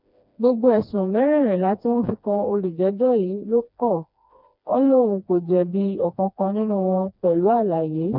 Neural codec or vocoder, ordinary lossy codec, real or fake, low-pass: codec, 16 kHz, 2 kbps, FreqCodec, smaller model; none; fake; 5.4 kHz